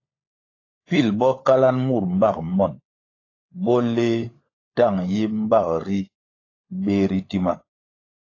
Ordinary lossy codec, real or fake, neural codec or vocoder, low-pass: AAC, 32 kbps; fake; codec, 16 kHz, 16 kbps, FunCodec, trained on LibriTTS, 50 frames a second; 7.2 kHz